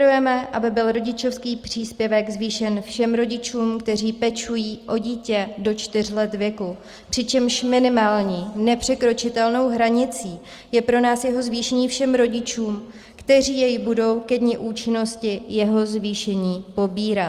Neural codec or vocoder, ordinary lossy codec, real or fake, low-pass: none; Opus, 32 kbps; real; 14.4 kHz